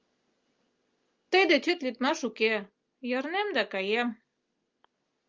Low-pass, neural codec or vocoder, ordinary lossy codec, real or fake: 7.2 kHz; none; Opus, 24 kbps; real